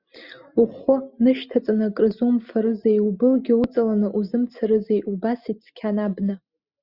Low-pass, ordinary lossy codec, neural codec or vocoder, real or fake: 5.4 kHz; Opus, 64 kbps; none; real